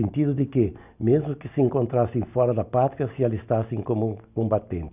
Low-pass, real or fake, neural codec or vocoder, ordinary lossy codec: 3.6 kHz; real; none; none